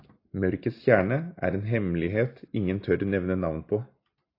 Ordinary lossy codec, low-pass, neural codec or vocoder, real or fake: AAC, 32 kbps; 5.4 kHz; none; real